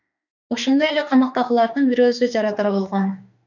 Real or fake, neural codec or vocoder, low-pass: fake; autoencoder, 48 kHz, 32 numbers a frame, DAC-VAE, trained on Japanese speech; 7.2 kHz